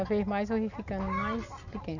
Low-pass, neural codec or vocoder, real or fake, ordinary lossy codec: 7.2 kHz; none; real; none